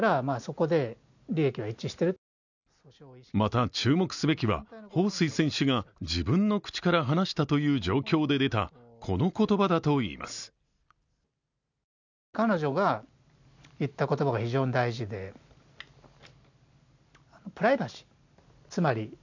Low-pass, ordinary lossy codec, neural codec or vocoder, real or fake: 7.2 kHz; none; none; real